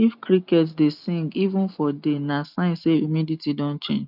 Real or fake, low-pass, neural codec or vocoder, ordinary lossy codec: real; 5.4 kHz; none; none